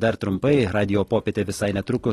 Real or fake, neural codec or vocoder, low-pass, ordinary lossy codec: real; none; 19.8 kHz; AAC, 32 kbps